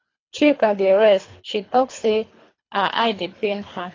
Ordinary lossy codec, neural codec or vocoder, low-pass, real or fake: AAC, 32 kbps; codec, 24 kHz, 3 kbps, HILCodec; 7.2 kHz; fake